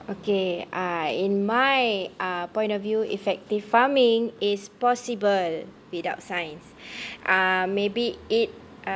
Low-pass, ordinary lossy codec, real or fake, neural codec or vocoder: none; none; real; none